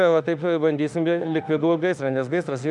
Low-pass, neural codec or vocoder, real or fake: 10.8 kHz; autoencoder, 48 kHz, 32 numbers a frame, DAC-VAE, trained on Japanese speech; fake